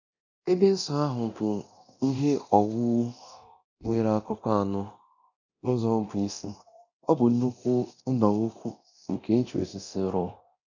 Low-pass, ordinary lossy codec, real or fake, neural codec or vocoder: 7.2 kHz; none; fake; codec, 24 kHz, 0.9 kbps, DualCodec